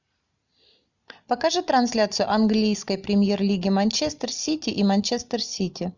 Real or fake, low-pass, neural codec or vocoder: real; 7.2 kHz; none